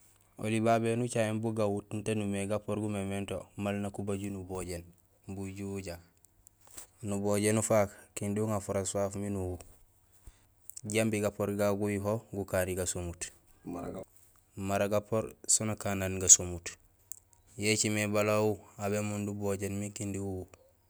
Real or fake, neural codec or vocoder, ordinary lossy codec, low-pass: real; none; none; none